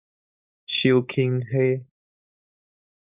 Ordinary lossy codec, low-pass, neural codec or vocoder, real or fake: Opus, 64 kbps; 3.6 kHz; codec, 16 kHz, 4.8 kbps, FACodec; fake